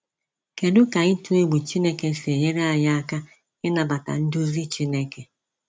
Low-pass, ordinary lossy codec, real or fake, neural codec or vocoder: none; none; real; none